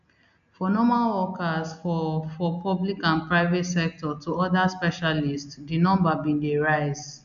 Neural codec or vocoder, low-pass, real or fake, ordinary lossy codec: none; 7.2 kHz; real; none